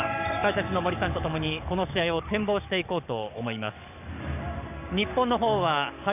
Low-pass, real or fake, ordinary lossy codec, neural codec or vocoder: 3.6 kHz; fake; none; codec, 44.1 kHz, 7.8 kbps, DAC